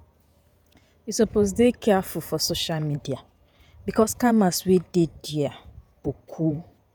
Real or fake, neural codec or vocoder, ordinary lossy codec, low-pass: fake; vocoder, 48 kHz, 128 mel bands, Vocos; none; none